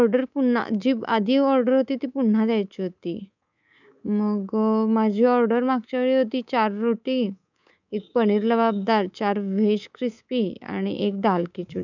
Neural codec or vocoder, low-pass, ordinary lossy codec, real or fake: codec, 24 kHz, 3.1 kbps, DualCodec; 7.2 kHz; none; fake